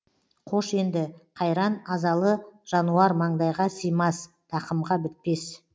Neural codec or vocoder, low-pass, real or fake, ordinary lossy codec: none; none; real; none